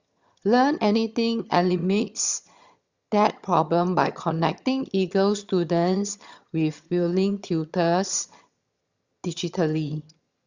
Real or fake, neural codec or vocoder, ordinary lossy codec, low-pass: fake; vocoder, 22.05 kHz, 80 mel bands, HiFi-GAN; Opus, 64 kbps; 7.2 kHz